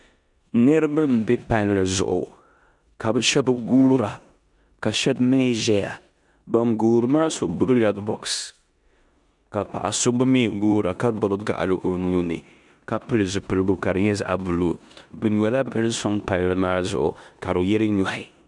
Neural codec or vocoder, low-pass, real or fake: codec, 16 kHz in and 24 kHz out, 0.9 kbps, LongCat-Audio-Codec, four codebook decoder; 10.8 kHz; fake